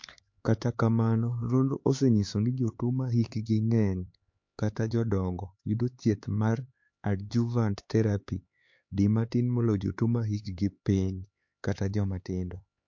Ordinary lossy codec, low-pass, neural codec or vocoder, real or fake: MP3, 48 kbps; 7.2 kHz; codec, 16 kHz, 4 kbps, X-Codec, HuBERT features, trained on LibriSpeech; fake